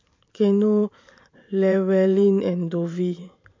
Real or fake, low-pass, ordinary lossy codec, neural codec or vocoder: fake; 7.2 kHz; MP3, 48 kbps; vocoder, 44.1 kHz, 128 mel bands every 512 samples, BigVGAN v2